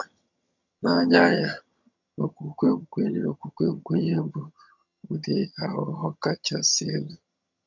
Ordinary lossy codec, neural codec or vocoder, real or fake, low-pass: none; vocoder, 22.05 kHz, 80 mel bands, HiFi-GAN; fake; 7.2 kHz